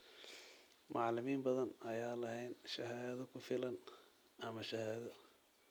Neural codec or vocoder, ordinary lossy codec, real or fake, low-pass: none; none; real; none